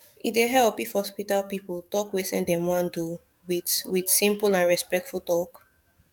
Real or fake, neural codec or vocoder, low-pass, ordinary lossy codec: fake; codec, 44.1 kHz, 7.8 kbps, DAC; 19.8 kHz; none